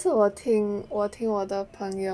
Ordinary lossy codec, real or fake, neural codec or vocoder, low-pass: none; real; none; none